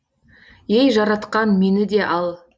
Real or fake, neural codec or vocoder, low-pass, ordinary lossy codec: real; none; none; none